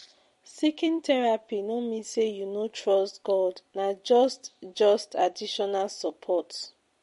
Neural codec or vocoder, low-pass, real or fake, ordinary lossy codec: vocoder, 44.1 kHz, 128 mel bands every 256 samples, BigVGAN v2; 14.4 kHz; fake; MP3, 48 kbps